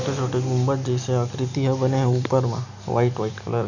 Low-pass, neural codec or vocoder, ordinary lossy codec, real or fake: 7.2 kHz; none; none; real